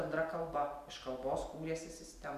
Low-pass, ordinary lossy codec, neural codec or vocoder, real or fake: 14.4 kHz; MP3, 96 kbps; none; real